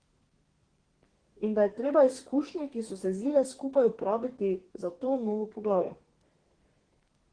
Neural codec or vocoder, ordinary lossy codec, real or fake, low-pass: codec, 32 kHz, 1.9 kbps, SNAC; Opus, 16 kbps; fake; 9.9 kHz